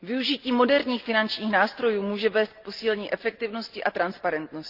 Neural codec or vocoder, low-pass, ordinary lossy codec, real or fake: none; 5.4 kHz; Opus, 32 kbps; real